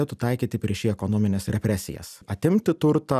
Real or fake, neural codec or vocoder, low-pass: real; none; 14.4 kHz